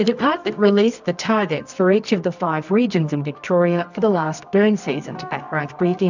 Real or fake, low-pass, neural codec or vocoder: fake; 7.2 kHz; codec, 24 kHz, 0.9 kbps, WavTokenizer, medium music audio release